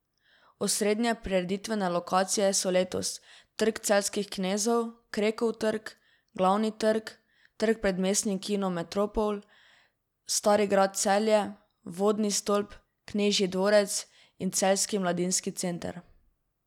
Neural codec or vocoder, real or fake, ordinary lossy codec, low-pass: none; real; none; 19.8 kHz